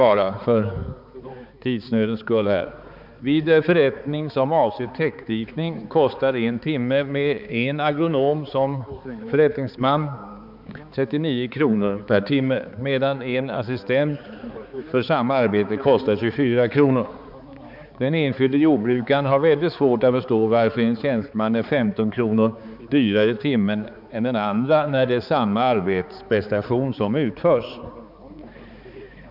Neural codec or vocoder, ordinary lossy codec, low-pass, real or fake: codec, 16 kHz, 4 kbps, X-Codec, HuBERT features, trained on balanced general audio; none; 5.4 kHz; fake